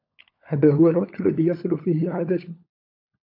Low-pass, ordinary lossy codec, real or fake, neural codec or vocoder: 5.4 kHz; AAC, 32 kbps; fake; codec, 16 kHz, 16 kbps, FunCodec, trained on LibriTTS, 50 frames a second